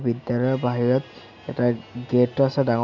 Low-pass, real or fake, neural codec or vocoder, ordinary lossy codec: 7.2 kHz; real; none; none